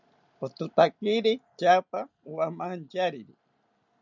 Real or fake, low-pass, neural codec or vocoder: real; 7.2 kHz; none